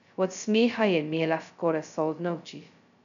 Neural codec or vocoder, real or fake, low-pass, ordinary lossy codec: codec, 16 kHz, 0.2 kbps, FocalCodec; fake; 7.2 kHz; none